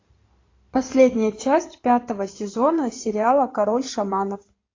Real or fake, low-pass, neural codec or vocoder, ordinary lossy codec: fake; 7.2 kHz; codec, 16 kHz in and 24 kHz out, 2.2 kbps, FireRedTTS-2 codec; AAC, 32 kbps